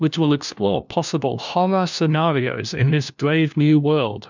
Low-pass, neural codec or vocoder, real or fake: 7.2 kHz; codec, 16 kHz, 1 kbps, FunCodec, trained on LibriTTS, 50 frames a second; fake